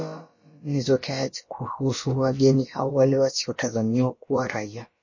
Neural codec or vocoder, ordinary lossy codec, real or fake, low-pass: codec, 16 kHz, about 1 kbps, DyCAST, with the encoder's durations; MP3, 32 kbps; fake; 7.2 kHz